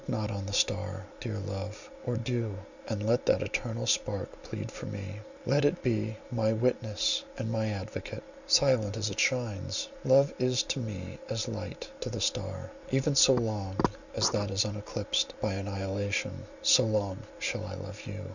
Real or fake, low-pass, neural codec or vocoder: real; 7.2 kHz; none